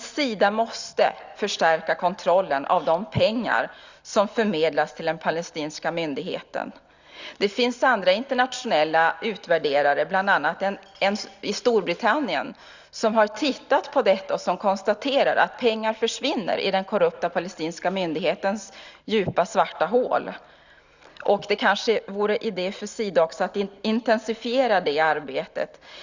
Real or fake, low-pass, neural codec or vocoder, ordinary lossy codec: real; 7.2 kHz; none; Opus, 64 kbps